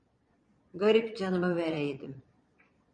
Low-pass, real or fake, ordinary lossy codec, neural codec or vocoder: 9.9 kHz; fake; MP3, 48 kbps; vocoder, 22.05 kHz, 80 mel bands, Vocos